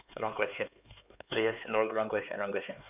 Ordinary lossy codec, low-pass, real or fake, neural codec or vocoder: none; 3.6 kHz; fake; codec, 16 kHz, 4 kbps, X-Codec, HuBERT features, trained on LibriSpeech